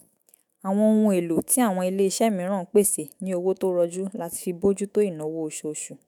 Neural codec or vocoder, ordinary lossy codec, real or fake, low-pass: autoencoder, 48 kHz, 128 numbers a frame, DAC-VAE, trained on Japanese speech; none; fake; none